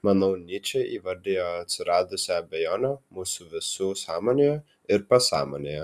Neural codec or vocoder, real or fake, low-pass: none; real; 14.4 kHz